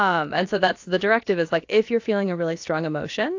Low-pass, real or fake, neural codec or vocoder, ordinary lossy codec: 7.2 kHz; fake; codec, 16 kHz, about 1 kbps, DyCAST, with the encoder's durations; AAC, 48 kbps